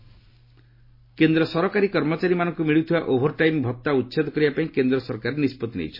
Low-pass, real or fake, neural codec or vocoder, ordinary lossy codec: 5.4 kHz; real; none; MP3, 24 kbps